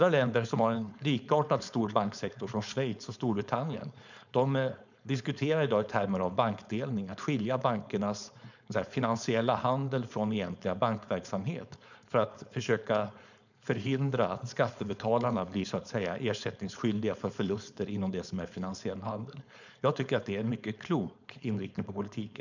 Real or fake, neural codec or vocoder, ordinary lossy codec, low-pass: fake; codec, 16 kHz, 4.8 kbps, FACodec; none; 7.2 kHz